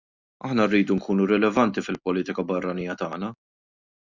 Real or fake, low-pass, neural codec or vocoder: real; 7.2 kHz; none